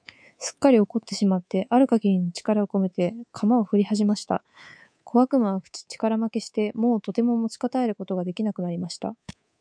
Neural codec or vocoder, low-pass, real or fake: codec, 24 kHz, 3.1 kbps, DualCodec; 9.9 kHz; fake